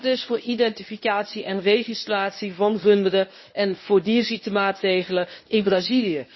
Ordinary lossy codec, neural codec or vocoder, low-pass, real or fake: MP3, 24 kbps; codec, 24 kHz, 0.9 kbps, WavTokenizer, medium speech release version 1; 7.2 kHz; fake